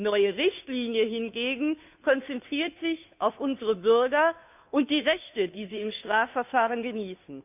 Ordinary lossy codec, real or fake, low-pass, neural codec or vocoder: none; fake; 3.6 kHz; codec, 16 kHz, 2 kbps, FunCodec, trained on Chinese and English, 25 frames a second